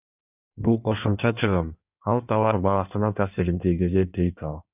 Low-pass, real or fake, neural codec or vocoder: 3.6 kHz; fake; codec, 16 kHz in and 24 kHz out, 1.1 kbps, FireRedTTS-2 codec